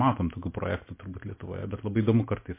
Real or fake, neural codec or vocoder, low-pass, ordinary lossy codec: real; none; 3.6 kHz; MP3, 24 kbps